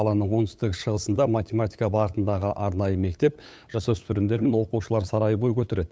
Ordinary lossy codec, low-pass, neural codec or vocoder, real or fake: none; none; codec, 16 kHz, 8 kbps, FunCodec, trained on LibriTTS, 25 frames a second; fake